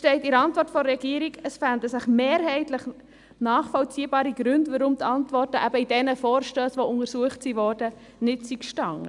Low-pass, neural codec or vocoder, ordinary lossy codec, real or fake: 10.8 kHz; none; none; real